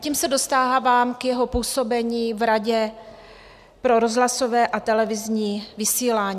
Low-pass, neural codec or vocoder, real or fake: 14.4 kHz; none; real